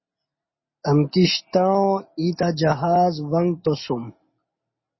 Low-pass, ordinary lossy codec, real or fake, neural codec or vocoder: 7.2 kHz; MP3, 24 kbps; real; none